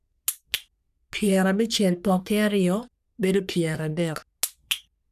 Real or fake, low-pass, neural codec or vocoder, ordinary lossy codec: fake; 14.4 kHz; codec, 44.1 kHz, 3.4 kbps, Pupu-Codec; none